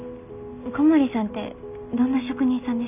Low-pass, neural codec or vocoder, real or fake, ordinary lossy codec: 3.6 kHz; none; real; none